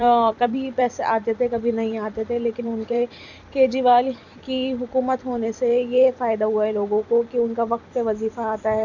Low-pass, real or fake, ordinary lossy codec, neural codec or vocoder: 7.2 kHz; fake; none; vocoder, 44.1 kHz, 128 mel bands every 256 samples, BigVGAN v2